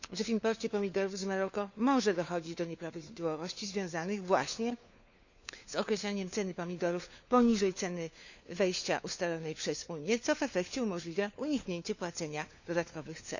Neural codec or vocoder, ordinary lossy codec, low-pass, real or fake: codec, 16 kHz, 4 kbps, FunCodec, trained on LibriTTS, 50 frames a second; none; 7.2 kHz; fake